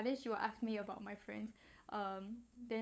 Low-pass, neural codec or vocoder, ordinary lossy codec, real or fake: none; codec, 16 kHz, 8 kbps, FunCodec, trained on LibriTTS, 25 frames a second; none; fake